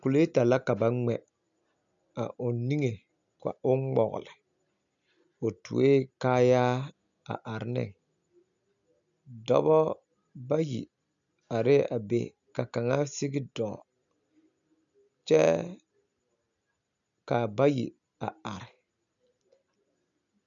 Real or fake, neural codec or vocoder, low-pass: real; none; 7.2 kHz